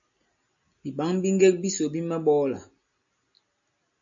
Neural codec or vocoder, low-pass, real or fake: none; 7.2 kHz; real